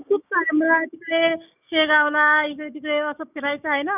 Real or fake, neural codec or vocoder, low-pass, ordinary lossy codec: fake; codec, 16 kHz, 6 kbps, DAC; 3.6 kHz; none